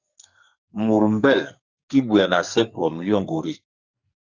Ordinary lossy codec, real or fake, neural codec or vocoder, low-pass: Opus, 64 kbps; fake; codec, 44.1 kHz, 2.6 kbps, SNAC; 7.2 kHz